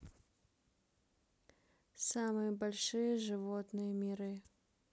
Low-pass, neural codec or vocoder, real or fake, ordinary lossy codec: none; none; real; none